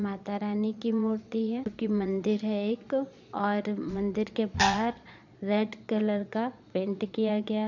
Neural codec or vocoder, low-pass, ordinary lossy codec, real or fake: vocoder, 22.05 kHz, 80 mel bands, Vocos; 7.2 kHz; none; fake